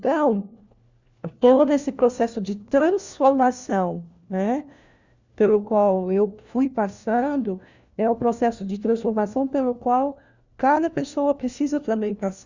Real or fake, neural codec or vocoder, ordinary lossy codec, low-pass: fake; codec, 16 kHz, 1 kbps, FunCodec, trained on LibriTTS, 50 frames a second; Opus, 64 kbps; 7.2 kHz